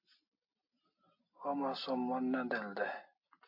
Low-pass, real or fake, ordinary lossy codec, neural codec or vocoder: 5.4 kHz; real; AAC, 32 kbps; none